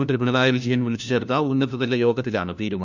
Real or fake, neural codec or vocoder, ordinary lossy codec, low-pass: fake; codec, 16 kHz, 1 kbps, FunCodec, trained on LibriTTS, 50 frames a second; none; 7.2 kHz